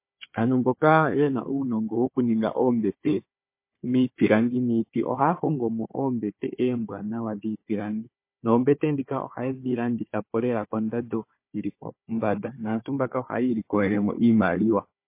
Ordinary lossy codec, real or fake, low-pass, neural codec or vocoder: MP3, 24 kbps; fake; 3.6 kHz; codec, 16 kHz, 4 kbps, FunCodec, trained on Chinese and English, 50 frames a second